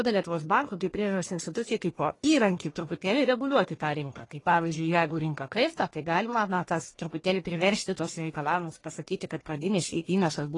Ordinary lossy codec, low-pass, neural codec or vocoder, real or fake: AAC, 32 kbps; 10.8 kHz; codec, 44.1 kHz, 1.7 kbps, Pupu-Codec; fake